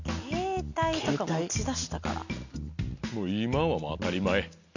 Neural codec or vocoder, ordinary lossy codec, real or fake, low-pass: none; none; real; 7.2 kHz